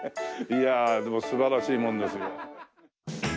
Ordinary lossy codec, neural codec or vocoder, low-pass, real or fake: none; none; none; real